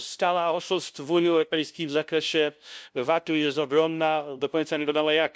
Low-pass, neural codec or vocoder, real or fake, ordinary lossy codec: none; codec, 16 kHz, 0.5 kbps, FunCodec, trained on LibriTTS, 25 frames a second; fake; none